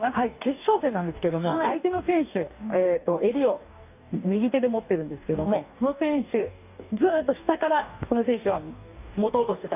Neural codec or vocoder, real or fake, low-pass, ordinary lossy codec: codec, 44.1 kHz, 2.6 kbps, DAC; fake; 3.6 kHz; none